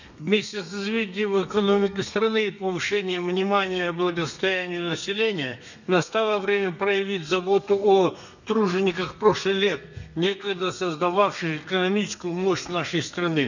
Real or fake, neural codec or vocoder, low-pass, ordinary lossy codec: fake; codec, 32 kHz, 1.9 kbps, SNAC; 7.2 kHz; none